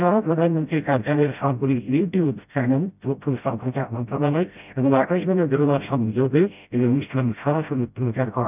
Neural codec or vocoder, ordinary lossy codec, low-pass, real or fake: codec, 16 kHz, 0.5 kbps, FreqCodec, smaller model; none; 3.6 kHz; fake